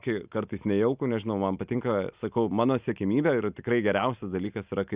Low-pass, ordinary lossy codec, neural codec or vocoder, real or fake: 3.6 kHz; Opus, 64 kbps; none; real